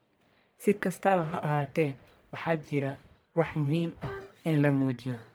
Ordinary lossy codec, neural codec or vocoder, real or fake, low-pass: none; codec, 44.1 kHz, 1.7 kbps, Pupu-Codec; fake; none